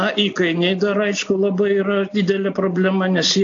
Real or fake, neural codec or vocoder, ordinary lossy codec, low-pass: real; none; AAC, 48 kbps; 7.2 kHz